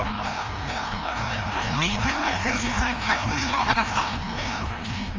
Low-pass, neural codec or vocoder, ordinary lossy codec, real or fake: 7.2 kHz; codec, 16 kHz, 1 kbps, FreqCodec, larger model; Opus, 32 kbps; fake